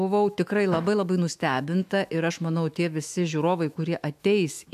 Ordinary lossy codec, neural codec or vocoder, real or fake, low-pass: AAC, 96 kbps; autoencoder, 48 kHz, 128 numbers a frame, DAC-VAE, trained on Japanese speech; fake; 14.4 kHz